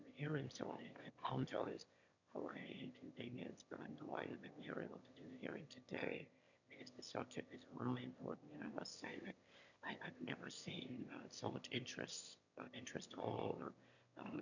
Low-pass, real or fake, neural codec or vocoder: 7.2 kHz; fake; autoencoder, 22.05 kHz, a latent of 192 numbers a frame, VITS, trained on one speaker